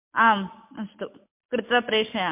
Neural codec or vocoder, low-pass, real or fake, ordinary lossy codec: none; 3.6 kHz; real; MP3, 32 kbps